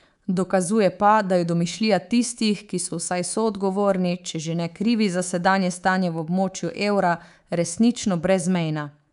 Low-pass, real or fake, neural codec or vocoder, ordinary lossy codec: 10.8 kHz; fake; codec, 24 kHz, 3.1 kbps, DualCodec; none